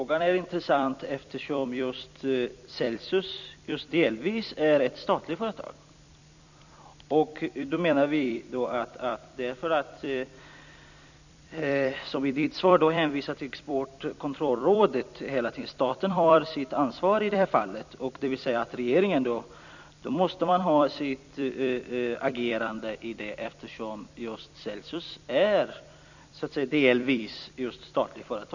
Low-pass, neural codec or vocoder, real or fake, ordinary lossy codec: 7.2 kHz; vocoder, 44.1 kHz, 128 mel bands every 256 samples, BigVGAN v2; fake; none